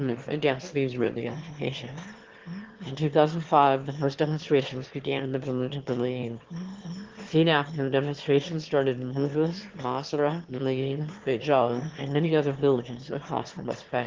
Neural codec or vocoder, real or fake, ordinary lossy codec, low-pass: autoencoder, 22.05 kHz, a latent of 192 numbers a frame, VITS, trained on one speaker; fake; Opus, 16 kbps; 7.2 kHz